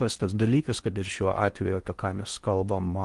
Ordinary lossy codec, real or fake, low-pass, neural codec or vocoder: Opus, 24 kbps; fake; 10.8 kHz; codec, 16 kHz in and 24 kHz out, 0.6 kbps, FocalCodec, streaming, 4096 codes